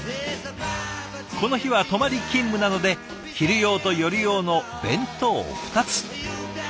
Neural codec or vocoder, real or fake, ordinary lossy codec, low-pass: none; real; none; none